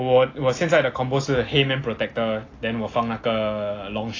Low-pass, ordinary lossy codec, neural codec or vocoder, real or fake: 7.2 kHz; AAC, 32 kbps; none; real